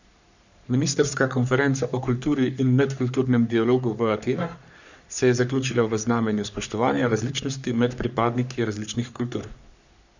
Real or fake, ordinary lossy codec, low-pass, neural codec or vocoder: fake; none; 7.2 kHz; codec, 44.1 kHz, 3.4 kbps, Pupu-Codec